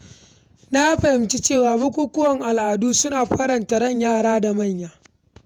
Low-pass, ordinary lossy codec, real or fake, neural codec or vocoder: 19.8 kHz; none; fake; vocoder, 48 kHz, 128 mel bands, Vocos